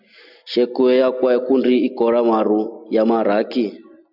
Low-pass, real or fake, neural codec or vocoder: 5.4 kHz; real; none